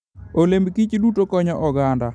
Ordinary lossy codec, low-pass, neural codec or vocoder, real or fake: none; 9.9 kHz; none; real